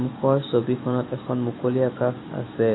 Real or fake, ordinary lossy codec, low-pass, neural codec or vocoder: real; AAC, 16 kbps; 7.2 kHz; none